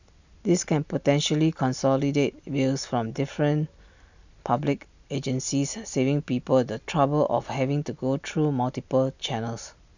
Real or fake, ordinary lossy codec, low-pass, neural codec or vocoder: real; none; 7.2 kHz; none